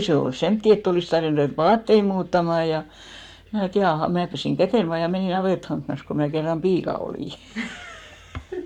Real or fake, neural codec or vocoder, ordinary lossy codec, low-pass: fake; codec, 44.1 kHz, 7.8 kbps, Pupu-Codec; none; 19.8 kHz